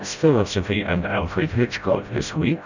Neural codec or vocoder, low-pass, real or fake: codec, 16 kHz, 0.5 kbps, FreqCodec, smaller model; 7.2 kHz; fake